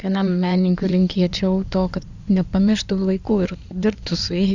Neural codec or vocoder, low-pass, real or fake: codec, 16 kHz in and 24 kHz out, 2.2 kbps, FireRedTTS-2 codec; 7.2 kHz; fake